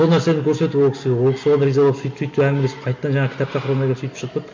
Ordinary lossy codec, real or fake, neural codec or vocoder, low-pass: MP3, 48 kbps; real; none; 7.2 kHz